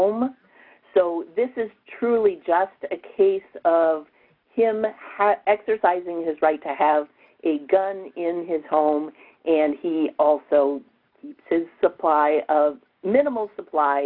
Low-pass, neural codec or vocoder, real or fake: 5.4 kHz; none; real